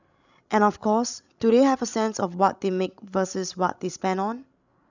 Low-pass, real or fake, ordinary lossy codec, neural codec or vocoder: 7.2 kHz; fake; none; codec, 16 kHz, 16 kbps, FreqCodec, larger model